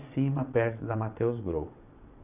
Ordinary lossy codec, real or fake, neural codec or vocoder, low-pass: none; fake; vocoder, 22.05 kHz, 80 mel bands, WaveNeXt; 3.6 kHz